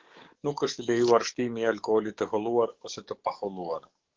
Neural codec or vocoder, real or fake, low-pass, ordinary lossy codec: codec, 44.1 kHz, 7.8 kbps, DAC; fake; 7.2 kHz; Opus, 16 kbps